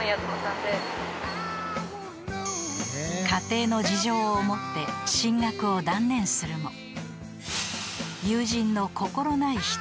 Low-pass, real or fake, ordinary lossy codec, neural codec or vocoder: none; real; none; none